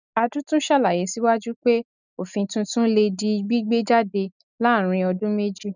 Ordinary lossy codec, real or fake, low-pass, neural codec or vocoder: none; real; 7.2 kHz; none